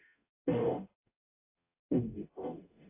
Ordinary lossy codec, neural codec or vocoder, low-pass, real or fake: AAC, 24 kbps; codec, 44.1 kHz, 0.9 kbps, DAC; 3.6 kHz; fake